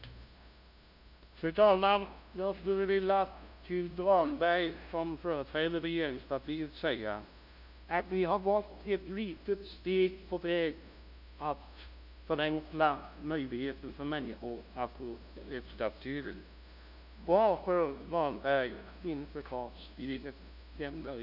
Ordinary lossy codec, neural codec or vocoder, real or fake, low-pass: none; codec, 16 kHz, 0.5 kbps, FunCodec, trained on LibriTTS, 25 frames a second; fake; 5.4 kHz